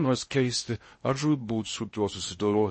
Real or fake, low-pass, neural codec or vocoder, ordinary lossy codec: fake; 10.8 kHz; codec, 16 kHz in and 24 kHz out, 0.6 kbps, FocalCodec, streaming, 2048 codes; MP3, 32 kbps